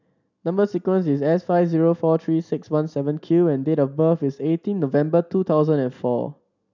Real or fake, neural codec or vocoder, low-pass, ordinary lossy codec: real; none; 7.2 kHz; none